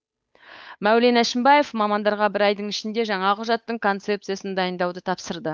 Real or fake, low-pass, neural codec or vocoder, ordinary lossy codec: fake; none; codec, 16 kHz, 8 kbps, FunCodec, trained on Chinese and English, 25 frames a second; none